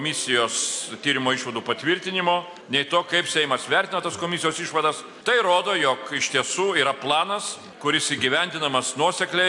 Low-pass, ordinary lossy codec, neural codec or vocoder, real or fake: 10.8 kHz; AAC, 64 kbps; none; real